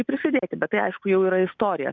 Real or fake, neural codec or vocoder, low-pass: real; none; 7.2 kHz